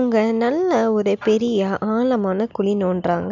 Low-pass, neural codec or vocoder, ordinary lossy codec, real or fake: 7.2 kHz; none; none; real